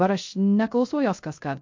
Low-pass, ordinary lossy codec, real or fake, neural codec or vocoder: 7.2 kHz; MP3, 48 kbps; fake; codec, 16 kHz, 0.3 kbps, FocalCodec